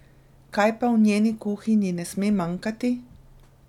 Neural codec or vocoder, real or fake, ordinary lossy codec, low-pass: none; real; none; 19.8 kHz